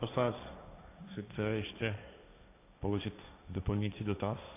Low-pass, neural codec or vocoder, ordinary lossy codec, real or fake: 3.6 kHz; codec, 16 kHz, 1.1 kbps, Voila-Tokenizer; AAC, 32 kbps; fake